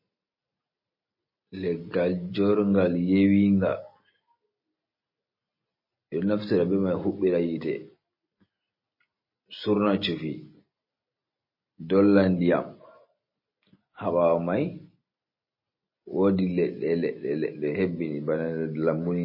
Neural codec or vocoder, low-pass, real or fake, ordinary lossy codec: none; 5.4 kHz; real; MP3, 24 kbps